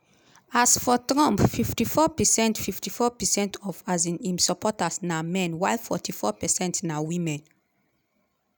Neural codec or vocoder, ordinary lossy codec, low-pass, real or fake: none; none; none; real